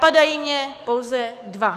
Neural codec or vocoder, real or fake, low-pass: codec, 44.1 kHz, 7.8 kbps, DAC; fake; 14.4 kHz